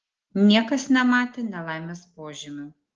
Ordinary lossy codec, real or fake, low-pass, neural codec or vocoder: Opus, 16 kbps; real; 7.2 kHz; none